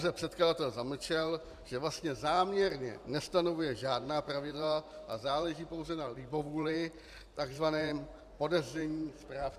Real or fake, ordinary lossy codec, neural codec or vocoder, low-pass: fake; AAC, 96 kbps; vocoder, 44.1 kHz, 128 mel bands every 512 samples, BigVGAN v2; 14.4 kHz